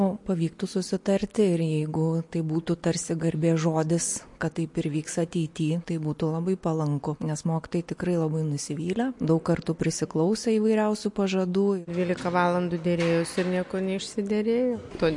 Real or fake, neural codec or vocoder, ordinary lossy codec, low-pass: real; none; MP3, 48 kbps; 10.8 kHz